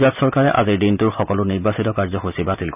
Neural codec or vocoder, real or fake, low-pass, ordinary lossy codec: none; real; 3.6 kHz; none